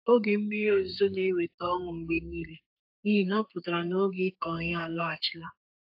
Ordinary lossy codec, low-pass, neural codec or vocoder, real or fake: AAC, 48 kbps; 5.4 kHz; codec, 32 kHz, 1.9 kbps, SNAC; fake